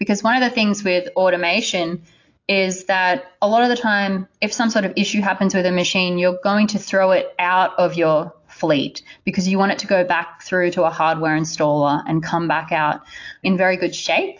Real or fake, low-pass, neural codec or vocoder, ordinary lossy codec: real; 7.2 kHz; none; AAC, 48 kbps